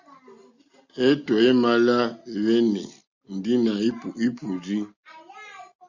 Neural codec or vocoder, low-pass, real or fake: none; 7.2 kHz; real